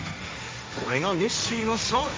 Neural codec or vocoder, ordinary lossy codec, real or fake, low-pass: codec, 16 kHz, 1.1 kbps, Voila-Tokenizer; none; fake; none